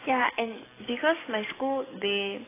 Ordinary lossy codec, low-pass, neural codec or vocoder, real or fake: AAC, 16 kbps; 3.6 kHz; codec, 44.1 kHz, 7.8 kbps, DAC; fake